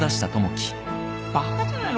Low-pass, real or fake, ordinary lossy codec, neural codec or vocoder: none; real; none; none